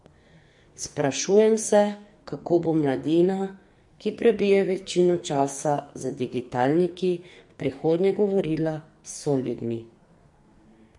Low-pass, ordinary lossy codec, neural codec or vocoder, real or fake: 10.8 kHz; MP3, 48 kbps; codec, 44.1 kHz, 2.6 kbps, SNAC; fake